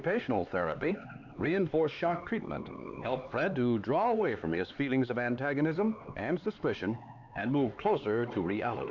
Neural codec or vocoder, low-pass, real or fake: codec, 16 kHz, 4 kbps, X-Codec, HuBERT features, trained on LibriSpeech; 7.2 kHz; fake